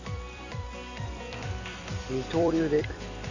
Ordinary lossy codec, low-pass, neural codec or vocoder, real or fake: none; 7.2 kHz; vocoder, 44.1 kHz, 128 mel bands every 256 samples, BigVGAN v2; fake